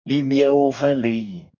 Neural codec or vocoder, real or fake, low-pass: codec, 44.1 kHz, 2.6 kbps, DAC; fake; 7.2 kHz